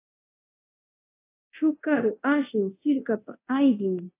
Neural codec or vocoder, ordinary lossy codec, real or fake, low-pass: codec, 24 kHz, 0.9 kbps, DualCodec; AAC, 24 kbps; fake; 3.6 kHz